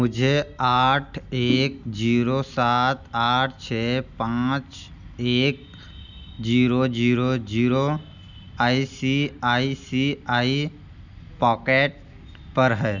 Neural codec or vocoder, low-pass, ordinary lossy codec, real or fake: none; 7.2 kHz; none; real